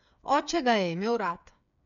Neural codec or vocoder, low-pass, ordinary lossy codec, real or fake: codec, 16 kHz, 8 kbps, FreqCodec, smaller model; 7.2 kHz; none; fake